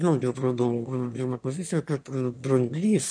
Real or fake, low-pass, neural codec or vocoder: fake; 9.9 kHz; autoencoder, 22.05 kHz, a latent of 192 numbers a frame, VITS, trained on one speaker